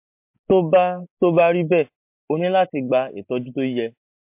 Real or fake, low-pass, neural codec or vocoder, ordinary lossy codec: real; 3.6 kHz; none; MP3, 32 kbps